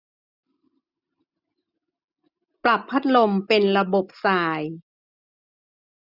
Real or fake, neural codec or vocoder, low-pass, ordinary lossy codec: real; none; 5.4 kHz; none